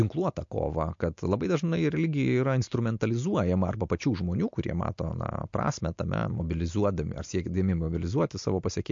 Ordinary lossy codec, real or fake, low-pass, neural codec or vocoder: MP3, 48 kbps; real; 7.2 kHz; none